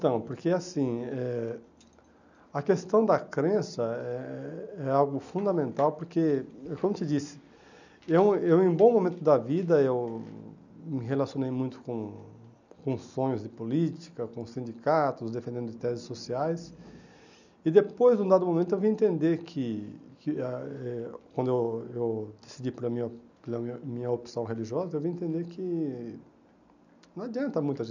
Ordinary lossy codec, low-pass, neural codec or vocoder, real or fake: none; 7.2 kHz; none; real